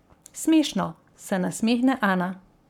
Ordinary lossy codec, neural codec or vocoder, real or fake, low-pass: none; codec, 44.1 kHz, 7.8 kbps, Pupu-Codec; fake; 19.8 kHz